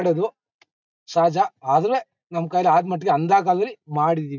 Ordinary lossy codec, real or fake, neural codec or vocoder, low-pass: none; real; none; 7.2 kHz